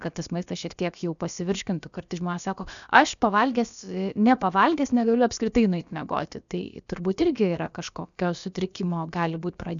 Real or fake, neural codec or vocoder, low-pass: fake; codec, 16 kHz, about 1 kbps, DyCAST, with the encoder's durations; 7.2 kHz